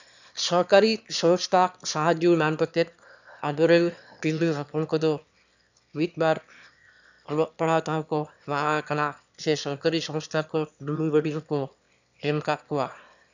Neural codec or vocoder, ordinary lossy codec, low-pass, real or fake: autoencoder, 22.05 kHz, a latent of 192 numbers a frame, VITS, trained on one speaker; none; 7.2 kHz; fake